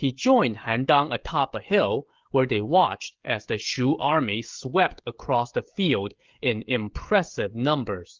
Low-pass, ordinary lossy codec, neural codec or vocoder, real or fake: 7.2 kHz; Opus, 32 kbps; codec, 16 kHz, 16 kbps, FreqCodec, smaller model; fake